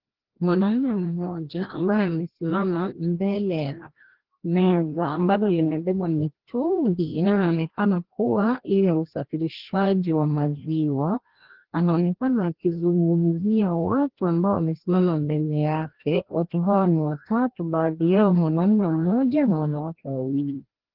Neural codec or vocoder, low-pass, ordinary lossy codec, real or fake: codec, 16 kHz, 1 kbps, FreqCodec, larger model; 5.4 kHz; Opus, 16 kbps; fake